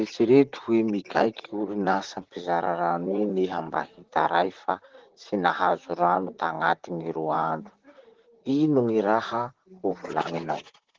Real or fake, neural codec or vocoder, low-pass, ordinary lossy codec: fake; vocoder, 44.1 kHz, 128 mel bands, Pupu-Vocoder; 7.2 kHz; Opus, 16 kbps